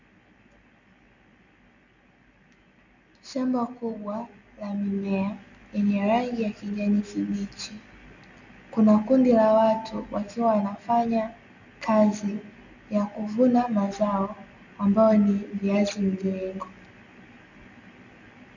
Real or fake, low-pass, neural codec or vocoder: real; 7.2 kHz; none